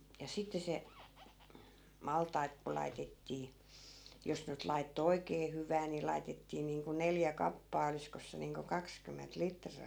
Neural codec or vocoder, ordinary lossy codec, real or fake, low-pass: none; none; real; none